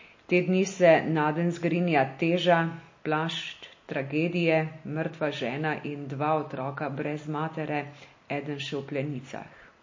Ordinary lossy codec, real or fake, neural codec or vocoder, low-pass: MP3, 32 kbps; real; none; 7.2 kHz